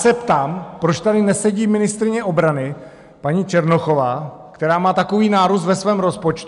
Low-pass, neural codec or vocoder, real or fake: 10.8 kHz; none; real